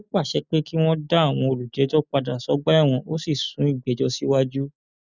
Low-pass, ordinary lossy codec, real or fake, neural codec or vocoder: 7.2 kHz; none; real; none